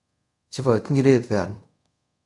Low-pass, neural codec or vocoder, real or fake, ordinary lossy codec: 10.8 kHz; codec, 24 kHz, 0.5 kbps, DualCodec; fake; MP3, 96 kbps